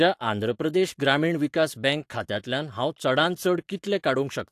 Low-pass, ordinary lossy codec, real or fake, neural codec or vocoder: 14.4 kHz; AAC, 64 kbps; fake; codec, 44.1 kHz, 7.8 kbps, Pupu-Codec